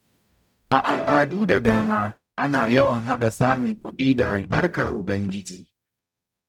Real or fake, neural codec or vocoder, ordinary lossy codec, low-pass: fake; codec, 44.1 kHz, 0.9 kbps, DAC; none; 19.8 kHz